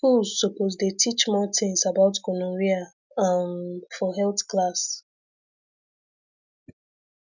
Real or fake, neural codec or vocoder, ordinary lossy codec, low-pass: real; none; none; 7.2 kHz